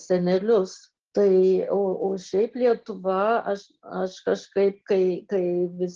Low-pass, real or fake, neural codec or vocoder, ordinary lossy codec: 7.2 kHz; real; none; Opus, 16 kbps